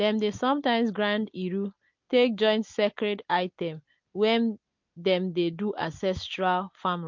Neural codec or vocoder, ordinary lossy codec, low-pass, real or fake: none; MP3, 48 kbps; 7.2 kHz; real